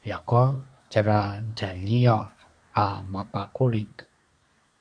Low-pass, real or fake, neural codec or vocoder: 9.9 kHz; fake; codec, 24 kHz, 1 kbps, SNAC